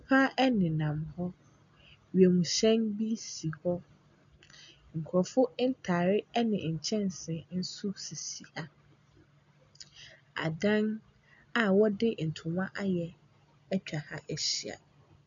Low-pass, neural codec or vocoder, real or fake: 7.2 kHz; none; real